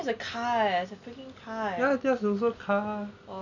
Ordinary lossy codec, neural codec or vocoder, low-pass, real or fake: none; none; 7.2 kHz; real